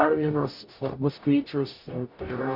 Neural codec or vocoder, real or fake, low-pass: codec, 44.1 kHz, 0.9 kbps, DAC; fake; 5.4 kHz